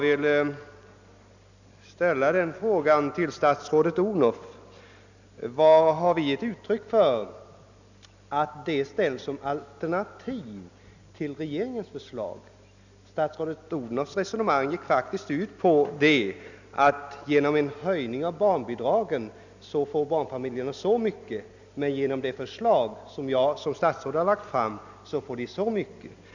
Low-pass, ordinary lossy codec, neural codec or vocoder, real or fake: 7.2 kHz; none; none; real